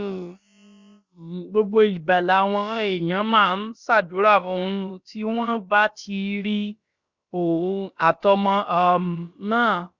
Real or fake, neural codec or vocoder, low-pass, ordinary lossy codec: fake; codec, 16 kHz, about 1 kbps, DyCAST, with the encoder's durations; 7.2 kHz; Opus, 64 kbps